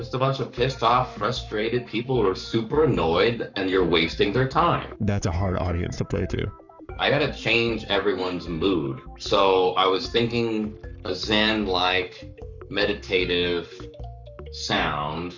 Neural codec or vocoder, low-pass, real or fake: codec, 44.1 kHz, 7.8 kbps, Pupu-Codec; 7.2 kHz; fake